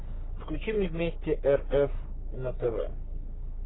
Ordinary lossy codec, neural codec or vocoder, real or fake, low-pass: AAC, 16 kbps; codec, 44.1 kHz, 3.4 kbps, Pupu-Codec; fake; 7.2 kHz